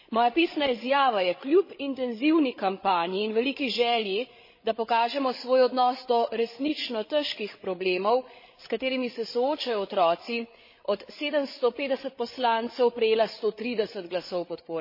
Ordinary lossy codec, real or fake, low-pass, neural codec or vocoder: MP3, 24 kbps; fake; 5.4 kHz; codec, 16 kHz, 16 kbps, FreqCodec, larger model